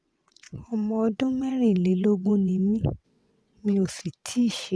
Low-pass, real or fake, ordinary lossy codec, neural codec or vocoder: none; fake; none; vocoder, 22.05 kHz, 80 mel bands, WaveNeXt